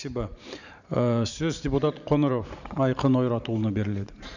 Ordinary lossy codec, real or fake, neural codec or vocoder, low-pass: none; fake; vocoder, 44.1 kHz, 128 mel bands every 512 samples, BigVGAN v2; 7.2 kHz